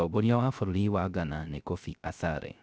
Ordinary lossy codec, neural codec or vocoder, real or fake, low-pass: none; codec, 16 kHz, 0.3 kbps, FocalCodec; fake; none